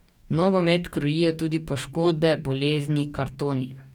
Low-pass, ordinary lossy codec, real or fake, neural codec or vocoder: 19.8 kHz; none; fake; codec, 44.1 kHz, 2.6 kbps, DAC